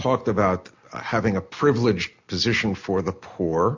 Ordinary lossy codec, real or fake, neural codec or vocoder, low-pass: MP3, 48 kbps; real; none; 7.2 kHz